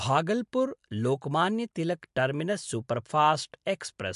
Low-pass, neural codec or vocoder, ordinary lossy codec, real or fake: 10.8 kHz; none; MP3, 64 kbps; real